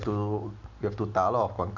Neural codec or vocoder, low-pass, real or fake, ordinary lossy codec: none; 7.2 kHz; real; none